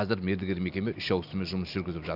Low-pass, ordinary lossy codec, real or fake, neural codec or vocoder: 5.4 kHz; none; real; none